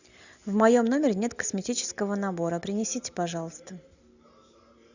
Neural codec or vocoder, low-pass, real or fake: none; 7.2 kHz; real